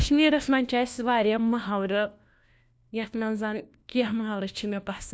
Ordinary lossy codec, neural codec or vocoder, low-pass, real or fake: none; codec, 16 kHz, 1 kbps, FunCodec, trained on LibriTTS, 50 frames a second; none; fake